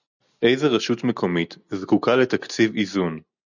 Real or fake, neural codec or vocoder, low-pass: real; none; 7.2 kHz